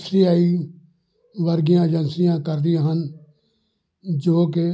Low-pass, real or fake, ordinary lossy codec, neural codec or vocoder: none; real; none; none